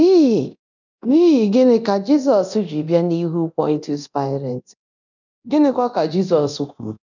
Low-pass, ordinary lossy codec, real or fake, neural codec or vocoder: 7.2 kHz; none; fake; codec, 24 kHz, 0.9 kbps, DualCodec